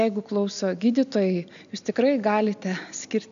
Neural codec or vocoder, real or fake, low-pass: none; real; 7.2 kHz